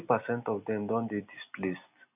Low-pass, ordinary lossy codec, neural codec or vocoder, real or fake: 3.6 kHz; none; none; real